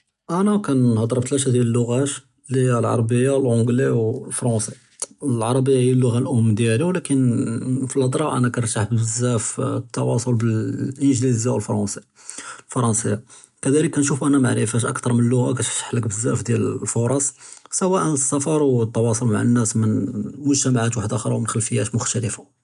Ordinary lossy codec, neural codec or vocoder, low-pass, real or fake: none; none; 10.8 kHz; real